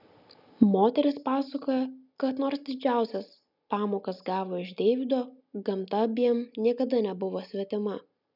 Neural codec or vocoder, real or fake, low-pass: none; real; 5.4 kHz